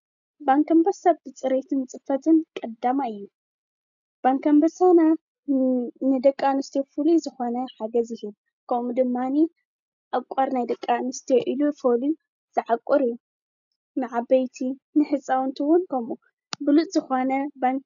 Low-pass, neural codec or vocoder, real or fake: 7.2 kHz; none; real